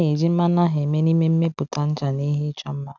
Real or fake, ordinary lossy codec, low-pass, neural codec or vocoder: real; none; 7.2 kHz; none